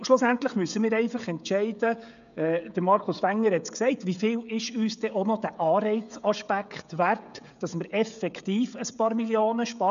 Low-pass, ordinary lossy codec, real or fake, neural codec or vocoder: 7.2 kHz; MP3, 96 kbps; fake; codec, 16 kHz, 16 kbps, FreqCodec, smaller model